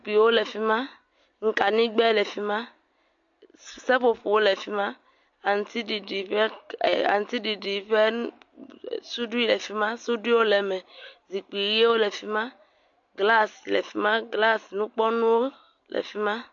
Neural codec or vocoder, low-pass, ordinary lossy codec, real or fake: none; 7.2 kHz; MP3, 48 kbps; real